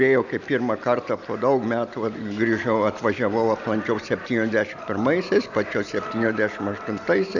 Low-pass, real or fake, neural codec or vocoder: 7.2 kHz; real; none